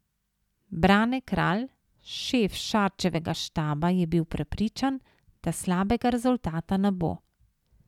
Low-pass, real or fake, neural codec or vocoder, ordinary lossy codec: 19.8 kHz; real; none; none